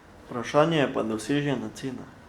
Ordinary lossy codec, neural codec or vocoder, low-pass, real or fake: Opus, 64 kbps; none; 19.8 kHz; real